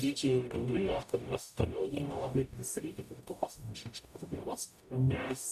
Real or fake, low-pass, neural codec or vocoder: fake; 14.4 kHz; codec, 44.1 kHz, 0.9 kbps, DAC